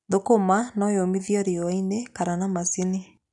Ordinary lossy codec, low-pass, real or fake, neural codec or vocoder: none; 10.8 kHz; real; none